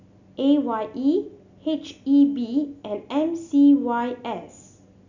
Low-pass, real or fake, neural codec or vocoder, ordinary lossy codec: 7.2 kHz; real; none; none